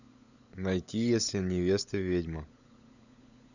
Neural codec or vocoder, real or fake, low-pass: codec, 16 kHz, 16 kbps, FunCodec, trained on LibriTTS, 50 frames a second; fake; 7.2 kHz